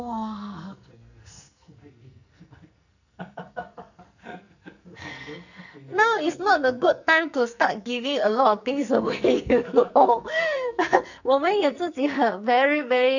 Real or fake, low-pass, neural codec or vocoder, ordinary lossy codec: fake; 7.2 kHz; codec, 44.1 kHz, 2.6 kbps, SNAC; none